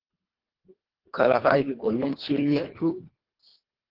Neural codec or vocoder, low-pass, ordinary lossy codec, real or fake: codec, 24 kHz, 1.5 kbps, HILCodec; 5.4 kHz; Opus, 16 kbps; fake